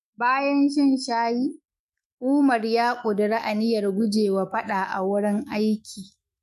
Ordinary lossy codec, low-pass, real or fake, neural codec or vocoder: MP3, 64 kbps; 14.4 kHz; fake; autoencoder, 48 kHz, 128 numbers a frame, DAC-VAE, trained on Japanese speech